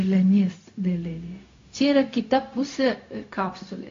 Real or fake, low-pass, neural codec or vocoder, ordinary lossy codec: fake; 7.2 kHz; codec, 16 kHz, 0.4 kbps, LongCat-Audio-Codec; AAC, 48 kbps